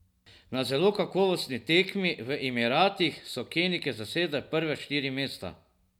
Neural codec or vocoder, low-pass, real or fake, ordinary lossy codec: none; 19.8 kHz; real; none